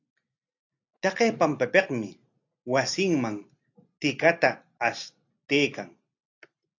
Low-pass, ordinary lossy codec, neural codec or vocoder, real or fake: 7.2 kHz; AAC, 48 kbps; none; real